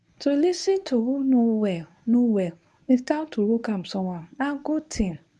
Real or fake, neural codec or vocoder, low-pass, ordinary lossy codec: fake; codec, 24 kHz, 0.9 kbps, WavTokenizer, medium speech release version 1; none; none